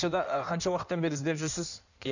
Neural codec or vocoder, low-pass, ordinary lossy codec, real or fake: codec, 16 kHz in and 24 kHz out, 1.1 kbps, FireRedTTS-2 codec; 7.2 kHz; none; fake